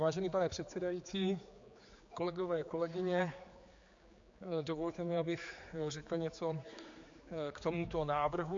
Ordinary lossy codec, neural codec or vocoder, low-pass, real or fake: MP3, 48 kbps; codec, 16 kHz, 4 kbps, X-Codec, HuBERT features, trained on general audio; 7.2 kHz; fake